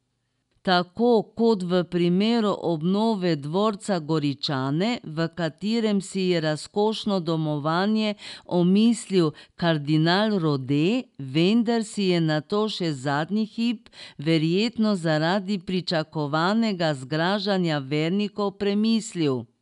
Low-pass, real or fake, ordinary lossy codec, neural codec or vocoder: 10.8 kHz; real; none; none